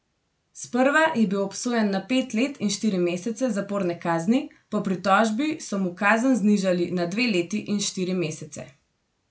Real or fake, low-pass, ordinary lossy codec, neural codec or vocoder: real; none; none; none